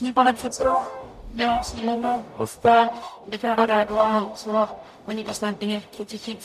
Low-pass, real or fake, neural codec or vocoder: 14.4 kHz; fake; codec, 44.1 kHz, 0.9 kbps, DAC